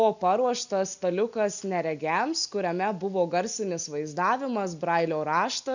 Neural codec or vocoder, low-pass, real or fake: none; 7.2 kHz; real